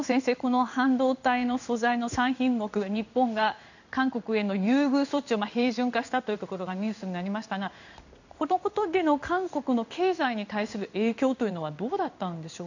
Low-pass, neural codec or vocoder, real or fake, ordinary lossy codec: 7.2 kHz; codec, 16 kHz in and 24 kHz out, 1 kbps, XY-Tokenizer; fake; none